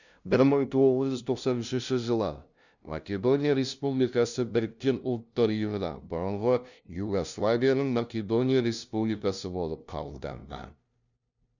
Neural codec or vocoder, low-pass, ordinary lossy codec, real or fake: codec, 16 kHz, 0.5 kbps, FunCodec, trained on LibriTTS, 25 frames a second; 7.2 kHz; none; fake